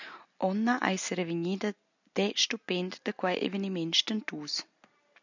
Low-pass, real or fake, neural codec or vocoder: 7.2 kHz; real; none